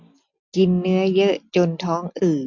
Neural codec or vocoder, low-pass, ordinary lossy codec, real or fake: none; 7.2 kHz; none; real